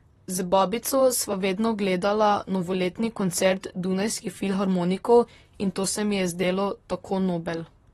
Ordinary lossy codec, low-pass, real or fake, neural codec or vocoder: AAC, 32 kbps; 19.8 kHz; fake; vocoder, 44.1 kHz, 128 mel bands, Pupu-Vocoder